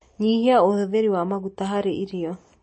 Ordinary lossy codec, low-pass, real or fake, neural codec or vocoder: MP3, 32 kbps; 9.9 kHz; real; none